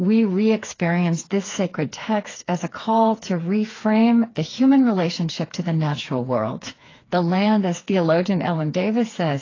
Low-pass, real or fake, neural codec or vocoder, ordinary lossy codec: 7.2 kHz; fake; codec, 16 kHz, 4 kbps, FreqCodec, smaller model; AAC, 32 kbps